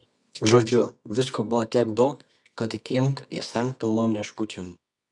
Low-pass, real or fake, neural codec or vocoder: 10.8 kHz; fake; codec, 24 kHz, 0.9 kbps, WavTokenizer, medium music audio release